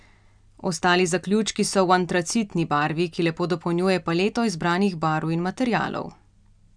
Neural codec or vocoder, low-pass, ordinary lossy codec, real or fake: none; 9.9 kHz; none; real